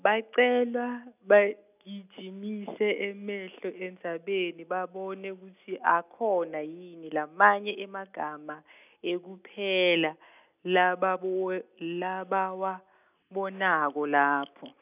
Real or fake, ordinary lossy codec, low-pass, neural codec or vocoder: real; none; 3.6 kHz; none